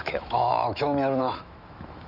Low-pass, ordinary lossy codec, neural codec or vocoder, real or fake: 5.4 kHz; none; autoencoder, 48 kHz, 128 numbers a frame, DAC-VAE, trained on Japanese speech; fake